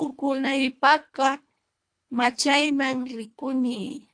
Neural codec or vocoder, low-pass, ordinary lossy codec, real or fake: codec, 24 kHz, 1.5 kbps, HILCodec; 9.9 kHz; AAC, 64 kbps; fake